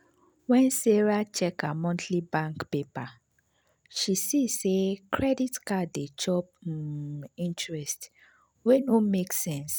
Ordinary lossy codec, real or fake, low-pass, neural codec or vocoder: none; real; none; none